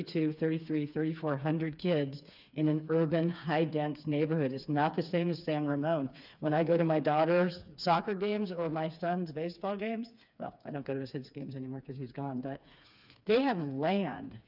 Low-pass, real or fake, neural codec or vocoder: 5.4 kHz; fake; codec, 16 kHz, 4 kbps, FreqCodec, smaller model